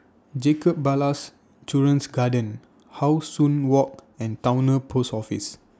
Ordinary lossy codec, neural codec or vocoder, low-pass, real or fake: none; none; none; real